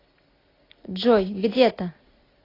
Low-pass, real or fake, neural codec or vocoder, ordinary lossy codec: 5.4 kHz; real; none; AAC, 24 kbps